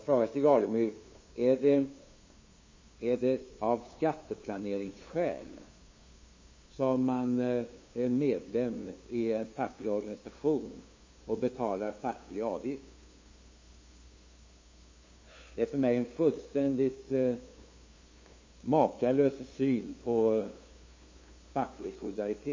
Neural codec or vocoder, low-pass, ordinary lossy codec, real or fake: codec, 16 kHz, 2 kbps, FunCodec, trained on LibriTTS, 25 frames a second; 7.2 kHz; MP3, 32 kbps; fake